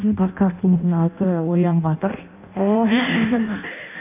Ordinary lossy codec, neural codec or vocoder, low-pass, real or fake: none; codec, 16 kHz in and 24 kHz out, 0.6 kbps, FireRedTTS-2 codec; 3.6 kHz; fake